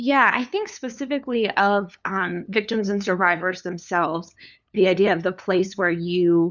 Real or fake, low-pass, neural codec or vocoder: fake; 7.2 kHz; codec, 16 kHz, 2 kbps, FunCodec, trained on LibriTTS, 25 frames a second